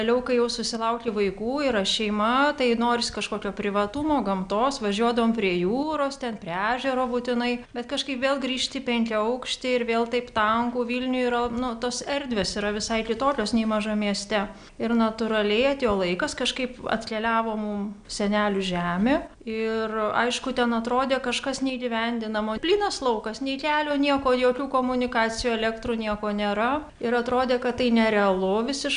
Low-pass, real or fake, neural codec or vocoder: 9.9 kHz; real; none